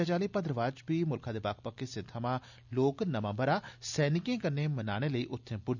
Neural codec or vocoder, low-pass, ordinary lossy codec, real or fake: none; 7.2 kHz; none; real